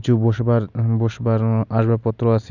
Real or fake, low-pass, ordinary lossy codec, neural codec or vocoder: real; 7.2 kHz; none; none